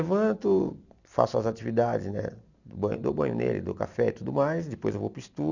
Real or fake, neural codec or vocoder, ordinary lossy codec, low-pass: real; none; none; 7.2 kHz